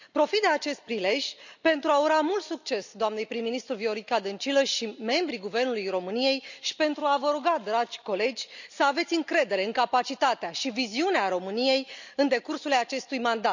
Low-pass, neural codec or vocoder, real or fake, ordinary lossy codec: 7.2 kHz; none; real; none